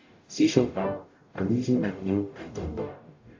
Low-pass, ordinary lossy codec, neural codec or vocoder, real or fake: 7.2 kHz; AAC, 48 kbps; codec, 44.1 kHz, 0.9 kbps, DAC; fake